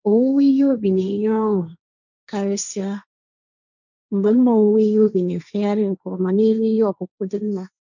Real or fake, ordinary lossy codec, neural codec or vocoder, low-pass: fake; none; codec, 16 kHz, 1.1 kbps, Voila-Tokenizer; 7.2 kHz